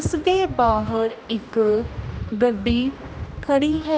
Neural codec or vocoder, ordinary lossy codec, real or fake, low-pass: codec, 16 kHz, 1 kbps, X-Codec, HuBERT features, trained on general audio; none; fake; none